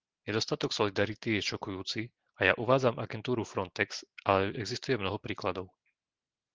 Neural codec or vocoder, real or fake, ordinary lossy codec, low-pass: none; real; Opus, 16 kbps; 7.2 kHz